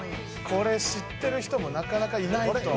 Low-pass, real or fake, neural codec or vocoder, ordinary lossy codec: none; real; none; none